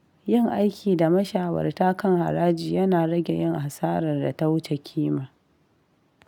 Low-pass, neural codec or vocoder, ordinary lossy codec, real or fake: 19.8 kHz; none; none; real